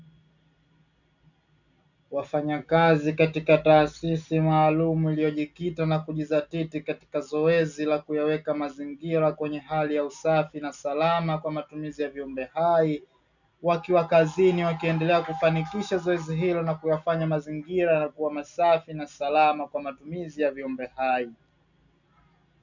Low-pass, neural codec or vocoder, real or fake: 7.2 kHz; none; real